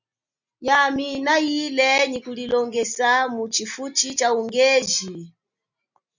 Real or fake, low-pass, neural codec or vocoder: real; 7.2 kHz; none